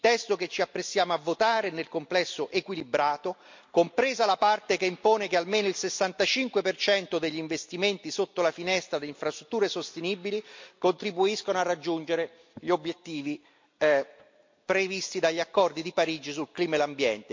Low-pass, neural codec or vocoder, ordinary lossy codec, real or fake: 7.2 kHz; none; MP3, 48 kbps; real